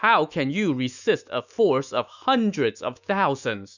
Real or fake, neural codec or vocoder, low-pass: fake; autoencoder, 48 kHz, 128 numbers a frame, DAC-VAE, trained on Japanese speech; 7.2 kHz